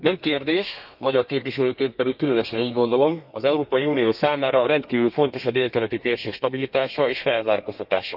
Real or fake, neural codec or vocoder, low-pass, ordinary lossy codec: fake; codec, 32 kHz, 1.9 kbps, SNAC; 5.4 kHz; none